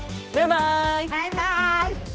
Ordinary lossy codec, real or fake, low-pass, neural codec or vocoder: none; fake; none; codec, 16 kHz, 1 kbps, X-Codec, HuBERT features, trained on balanced general audio